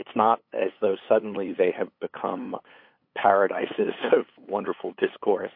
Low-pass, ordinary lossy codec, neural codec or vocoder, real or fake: 5.4 kHz; MP3, 32 kbps; codec, 16 kHz, 8 kbps, FunCodec, trained on LibriTTS, 25 frames a second; fake